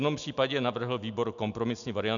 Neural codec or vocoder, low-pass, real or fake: none; 7.2 kHz; real